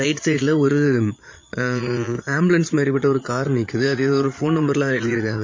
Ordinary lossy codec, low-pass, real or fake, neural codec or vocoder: MP3, 32 kbps; 7.2 kHz; fake; vocoder, 22.05 kHz, 80 mel bands, Vocos